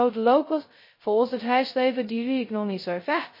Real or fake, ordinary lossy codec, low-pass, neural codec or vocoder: fake; MP3, 24 kbps; 5.4 kHz; codec, 16 kHz, 0.2 kbps, FocalCodec